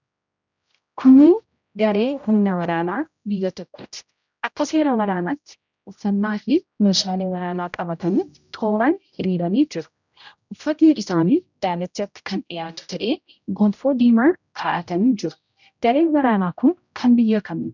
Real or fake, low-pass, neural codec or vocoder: fake; 7.2 kHz; codec, 16 kHz, 0.5 kbps, X-Codec, HuBERT features, trained on general audio